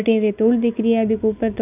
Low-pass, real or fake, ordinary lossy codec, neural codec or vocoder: 3.6 kHz; real; none; none